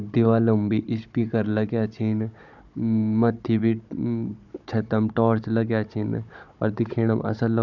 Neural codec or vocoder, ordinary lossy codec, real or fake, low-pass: codec, 16 kHz, 16 kbps, FunCodec, trained on Chinese and English, 50 frames a second; none; fake; 7.2 kHz